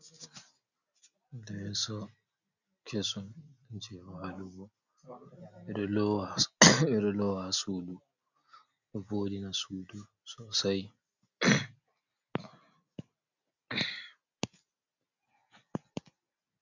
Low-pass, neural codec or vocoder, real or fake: 7.2 kHz; none; real